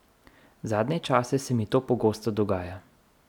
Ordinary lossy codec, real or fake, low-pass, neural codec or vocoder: none; real; 19.8 kHz; none